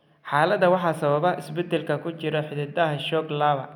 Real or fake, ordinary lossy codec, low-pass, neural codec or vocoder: real; none; 19.8 kHz; none